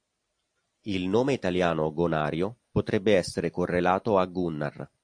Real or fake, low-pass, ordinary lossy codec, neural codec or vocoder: real; 9.9 kHz; AAC, 64 kbps; none